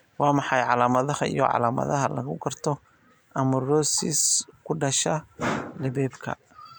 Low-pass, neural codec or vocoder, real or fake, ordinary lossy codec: none; none; real; none